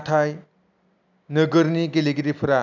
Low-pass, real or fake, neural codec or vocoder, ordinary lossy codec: 7.2 kHz; real; none; none